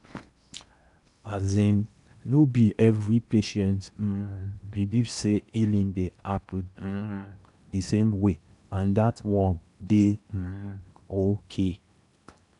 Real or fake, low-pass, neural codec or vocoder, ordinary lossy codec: fake; 10.8 kHz; codec, 16 kHz in and 24 kHz out, 0.8 kbps, FocalCodec, streaming, 65536 codes; none